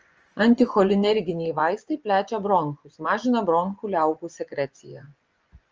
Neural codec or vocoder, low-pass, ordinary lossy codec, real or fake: vocoder, 24 kHz, 100 mel bands, Vocos; 7.2 kHz; Opus, 24 kbps; fake